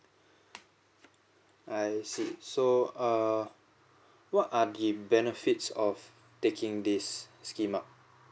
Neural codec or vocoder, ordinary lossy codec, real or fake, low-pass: none; none; real; none